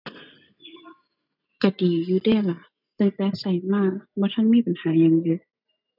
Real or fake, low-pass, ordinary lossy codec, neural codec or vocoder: real; 5.4 kHz; none; none